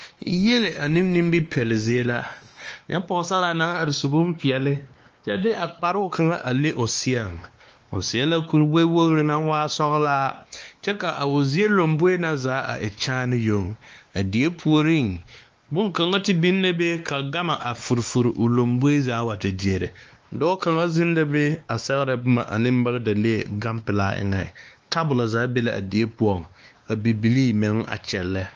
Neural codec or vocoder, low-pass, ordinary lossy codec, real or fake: codec, 16 kHz, 2 kbps, X-Codec, WavLM features, trained on Multilingual LibriSpeech; 7.2 kHz; Opus, 24 kbps; fake